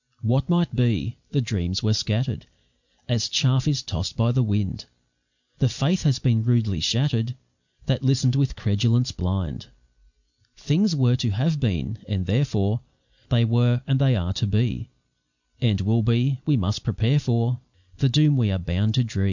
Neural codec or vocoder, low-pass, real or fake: none; 7.2 kHz; real